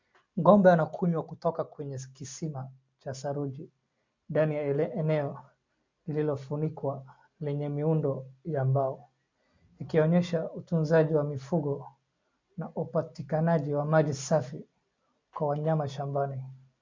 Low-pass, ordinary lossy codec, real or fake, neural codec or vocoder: 7.2 kHz; MP3, 64 kbps; real; none